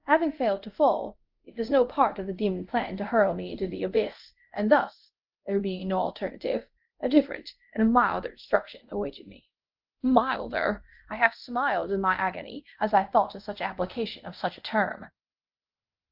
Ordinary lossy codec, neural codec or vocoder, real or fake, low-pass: Opus, 24 kbps; codec, 24 kHz, 0.5 kbps, DualCodec; fake; 5.4 kHz